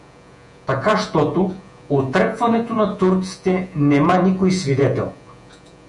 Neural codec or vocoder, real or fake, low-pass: vocoder, 48 kHz, 128 mel bands, Vocos; fake; 10.8 kHz